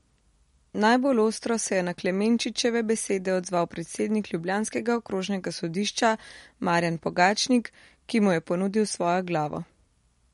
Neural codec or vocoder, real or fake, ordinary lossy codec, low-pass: none; real; MP3, 48 kbps; 14.4 kHz